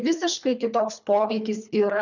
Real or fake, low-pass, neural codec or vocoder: fake; 7.2 kHz; codec, 24 kHz, 3 kbps, HILCodec